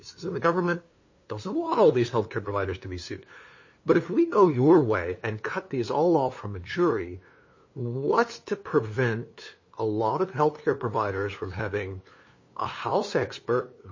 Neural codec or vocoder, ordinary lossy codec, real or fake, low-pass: codec, 16 kHz, 2 kbps, FunCodec, trained on LibriTTS, 25 frames a second; MP3, 32 kbps; fake; 7.2 kHz